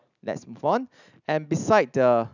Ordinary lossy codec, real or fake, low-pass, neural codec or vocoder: none; real; 7.2 kHz; none